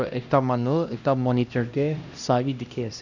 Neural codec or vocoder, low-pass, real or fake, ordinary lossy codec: codec, 16 kHz, 1 kbps, X-Codec, HuBERT features, trained on LibriSpeech; 7.2 kHz; fake; none